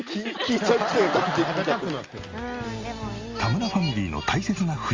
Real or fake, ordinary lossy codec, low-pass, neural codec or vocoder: real; Opus, 32 kbps; 7.2 kHz; none